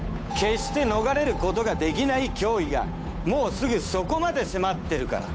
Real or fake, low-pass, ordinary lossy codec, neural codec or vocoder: fake; none; none; codec, 16 kHz, 8 kbps, FunCodec, trained on Chinese and English, 25 frames a second